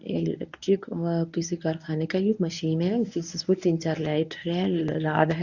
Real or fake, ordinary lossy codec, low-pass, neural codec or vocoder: fake; none; 7.2 kHz; codec, 24 kHz, 0.9 kbps, WavTokenizer, medium speech release version 1